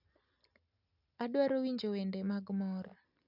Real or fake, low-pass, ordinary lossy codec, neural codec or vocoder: real; 5.4 kHz; none; none